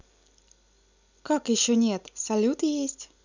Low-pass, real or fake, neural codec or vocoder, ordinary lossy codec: 7.2 kHz; real; none; none